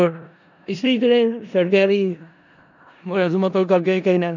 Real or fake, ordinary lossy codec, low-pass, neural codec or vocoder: fake; none; 7.2 kHz; codec, 16 kHz in and 24 kHz out, 0.4 kbps, LongCat-Audio-Codec, four codebook decoder